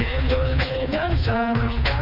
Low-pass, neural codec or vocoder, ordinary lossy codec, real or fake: 5.4 kHz; codec, 16 kHz, 2 kbps, FreqCodec, smaller model; none; fake